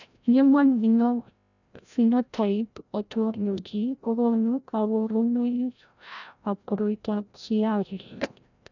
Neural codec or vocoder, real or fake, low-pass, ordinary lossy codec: codec, 16 kHz, 0.5 kbps, FreqCodec, larger model; fake; 7.2 kHz; none